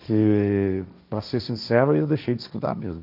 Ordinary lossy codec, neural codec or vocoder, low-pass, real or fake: none; codec, 16 kHz, 1.1 kbps, Voila-Tokenizer; 5.4 kHz; fake